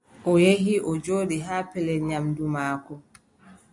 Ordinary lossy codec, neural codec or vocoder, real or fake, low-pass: AAC, 64 kbps; none; real; 10.8 kHz